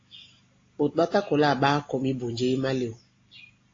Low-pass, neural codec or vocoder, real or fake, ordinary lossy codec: 7.2 kHz; none; real; AAC, 32 kbps